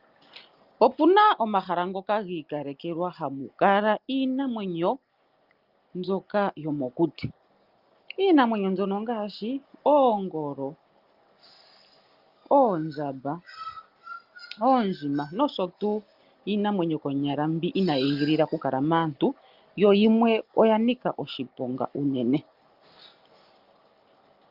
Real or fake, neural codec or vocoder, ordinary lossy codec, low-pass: real; none; Opus, 32 kbps; 5.4 kHz